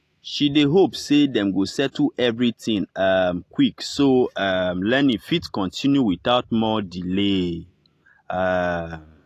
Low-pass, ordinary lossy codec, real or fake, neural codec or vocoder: 14.4 kHz; AAC, 48 kbps; real; none